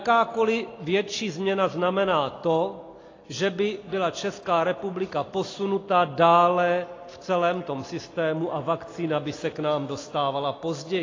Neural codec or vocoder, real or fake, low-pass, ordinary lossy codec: none; real; 7.2 kHz; AAC, 32 kbps